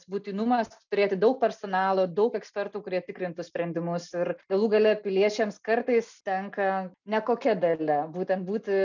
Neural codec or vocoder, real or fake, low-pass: none; real; 7.2 kHz